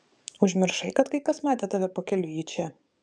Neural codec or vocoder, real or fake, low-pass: codec, 44.1 kHz, 7.8 kbps, DAC; fake; 9.9 kHz